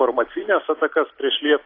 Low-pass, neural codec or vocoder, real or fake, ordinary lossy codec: 5.4 kHz; none; real; AAC, 32 kbps